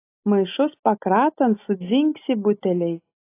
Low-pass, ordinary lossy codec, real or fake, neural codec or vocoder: 3.6 kHz; AAC, 24 kbps; real; none